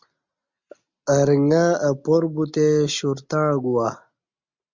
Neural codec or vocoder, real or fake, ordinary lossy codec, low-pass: none; real; MP3, 64 kbps; 7.2 kHz